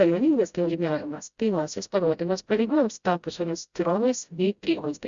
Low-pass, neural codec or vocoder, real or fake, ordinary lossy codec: 7.2 kHz; codec, 16 kHz, 0.5 kbps, FreqCodec, smaller model; fake; Opus, 64 kbps